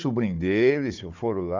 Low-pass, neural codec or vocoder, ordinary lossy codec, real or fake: 7.2 kHz; codec, 16 kHz, 4 kbps, FunCodec, trained on Chinese and English, 50 frames a second; Opus, 64 kbps; fake